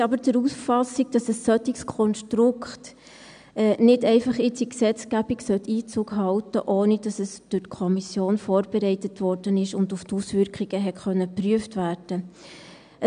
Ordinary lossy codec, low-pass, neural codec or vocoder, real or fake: AAC, 96 kbps; 9.9 kHz; none; real